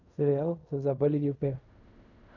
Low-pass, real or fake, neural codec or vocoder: 7.2 kHz; fake; codec, 16 kHz in and 24 kHz out, 0.4 kbps, LongCat-Audio-Codec, fine tuned four codebook decoder